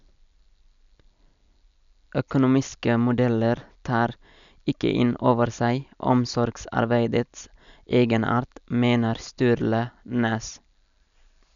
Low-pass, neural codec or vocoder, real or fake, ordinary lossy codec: 7.2 kHz; none; real; none